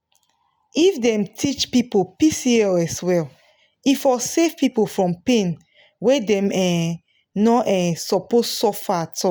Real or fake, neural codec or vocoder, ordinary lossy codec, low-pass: real; none; none; none